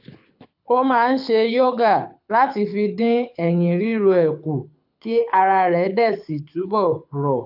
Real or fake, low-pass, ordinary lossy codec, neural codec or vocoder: fake; 5.4 kHz; AAC, 48 kbps; codec, 24 kHz, 6 kbps, HILCodec